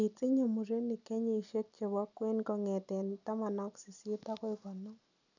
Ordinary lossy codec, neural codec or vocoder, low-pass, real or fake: none; none; 7.2 kHz; real